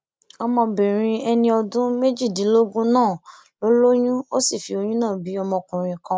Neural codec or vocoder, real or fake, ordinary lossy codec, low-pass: none; real; none; none